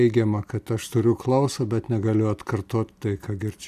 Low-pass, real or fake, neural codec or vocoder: 14.4 kHz; real; none